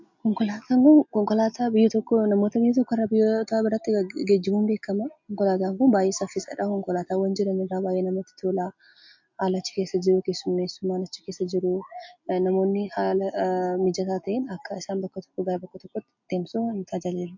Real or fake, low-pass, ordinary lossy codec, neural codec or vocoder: real; 7.2 kHz; MP3, 48 kbps; none